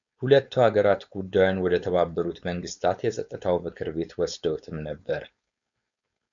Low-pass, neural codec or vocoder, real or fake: 7.2 kHz; codec, 16 kHz, 4.8 kbps, FACodec; fake